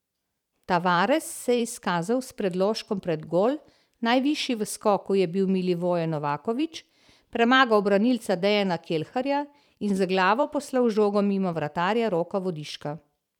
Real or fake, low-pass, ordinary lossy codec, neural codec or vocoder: fake; 19.8 kHz; none; vocoder, 44.1 kHz, 128 mel bands every 512 samples, BigVGAN v2